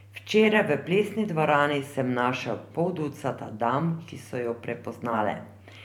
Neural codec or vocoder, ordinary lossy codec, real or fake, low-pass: vocoder, 44.1 kHz, 128 mel bands every 512 samples, BigVGAN v2; none; fake; 19.8 kHz